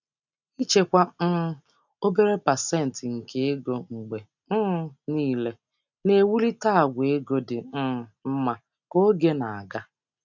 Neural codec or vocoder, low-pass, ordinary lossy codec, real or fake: none; 7.2 kHz; none; real